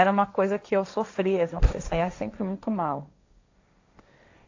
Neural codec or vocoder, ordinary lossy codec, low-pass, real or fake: codec, 16 kHz, 1.1 kbps, Voila-Tokenizer; AAC, 48 kbps; 7.2 kHz; fake